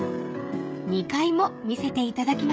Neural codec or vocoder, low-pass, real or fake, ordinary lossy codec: codec, 16 kHz, 16 kbps, FreqCodec, smaller model; none; fake; none